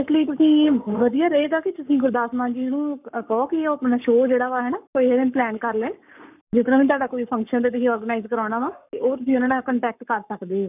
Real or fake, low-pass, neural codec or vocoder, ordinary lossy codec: fake; 3.6 kHz; codec, 44.1 kHz, 7.8 kbps, Pupu-Codec; none